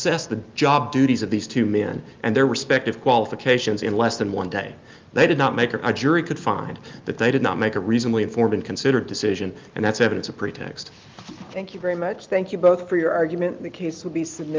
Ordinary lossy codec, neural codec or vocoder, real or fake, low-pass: Opus, 32 kbps; none; real; 7.2 kHz